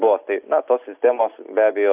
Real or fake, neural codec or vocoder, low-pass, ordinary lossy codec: real; none; 3.6 kHz; AAC, 32 kbps